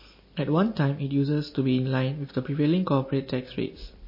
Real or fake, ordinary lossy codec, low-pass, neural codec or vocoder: real; MP3, 24 kbps; 5.4 kHz; none